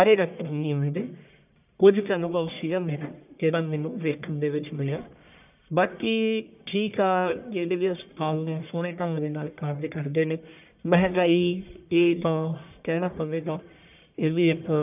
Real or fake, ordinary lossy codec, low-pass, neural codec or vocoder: fake; none; 3.6 kHz; codec, 44.1 kHz, 1.7 kbps, Pupu-Codec